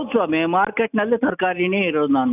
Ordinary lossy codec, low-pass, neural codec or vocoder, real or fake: none; 3.6 kHz; none; real